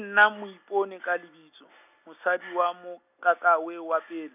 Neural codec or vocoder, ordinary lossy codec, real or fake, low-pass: none; none; real; 3.6 kHz